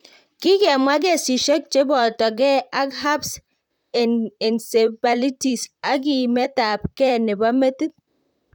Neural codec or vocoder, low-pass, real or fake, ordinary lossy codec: vocoder, 44.1 kHz, 128 mel bands, Pupu-Vocoder; 19.8 kHz; fake; none